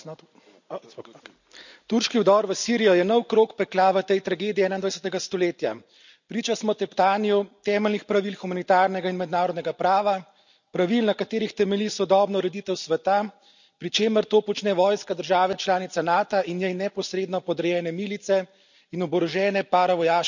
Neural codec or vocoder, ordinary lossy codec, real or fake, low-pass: none; none; real; 7.2 kHz